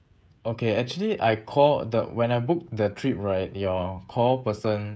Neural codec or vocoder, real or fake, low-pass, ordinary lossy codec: codec, 16 kHz, 16 kbps, FreqCodec, smaller model; fake; none; none